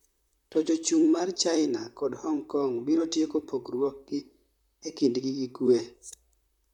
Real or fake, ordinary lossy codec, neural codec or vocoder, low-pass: fake; none; vocoder, 44.1 kHz, 128 mel bands, Pupu-Vocoder; 19.8 kHz